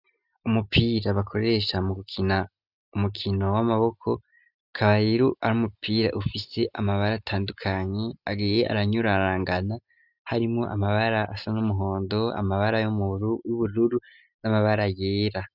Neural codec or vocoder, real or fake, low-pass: none; real; 5.4 kHz